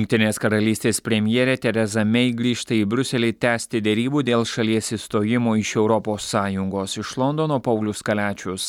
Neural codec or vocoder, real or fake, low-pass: none; real; 19.8 kHz